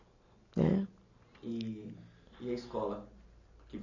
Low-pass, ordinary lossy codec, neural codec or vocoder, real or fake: 7.2 kHz; none; none; real